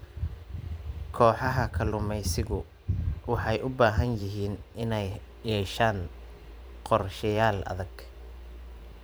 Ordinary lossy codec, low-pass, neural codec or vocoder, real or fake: none; none; vocoder, 44.1 kHz, 128 mel bands every 512 samples, BigVGAN v2; fake